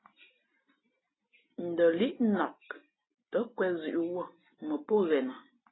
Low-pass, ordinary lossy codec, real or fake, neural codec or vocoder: 7.2 kHz; AAC, 16 kbps; real; none